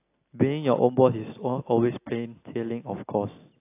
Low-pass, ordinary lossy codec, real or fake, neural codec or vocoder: 3.6 kHz; AAC, 24 kbps; real; none